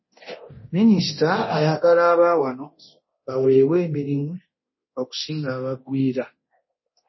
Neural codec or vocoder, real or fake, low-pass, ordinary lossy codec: codec, 24 kHz, 0.9 kbps, DualCodec; fake; 7.2 kHz; MP3, 24 kbps